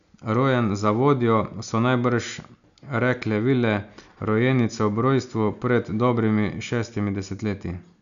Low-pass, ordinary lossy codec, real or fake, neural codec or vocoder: 7.2 kHz; none; real; none